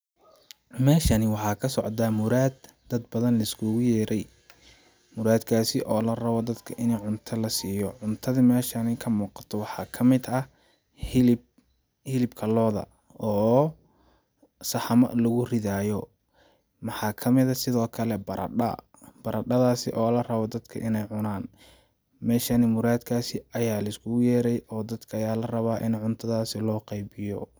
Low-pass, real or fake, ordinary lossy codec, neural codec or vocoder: none; real; none; none